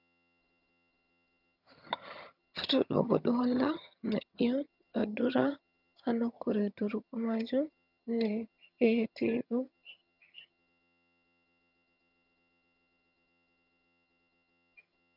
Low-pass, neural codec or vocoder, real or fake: 5.4 kHz; vocoder, 22.05 kHz, 80 mel bands, HiFi-GAN; fake